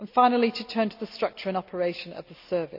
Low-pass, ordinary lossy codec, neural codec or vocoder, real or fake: 5.4 kHz; none; none; real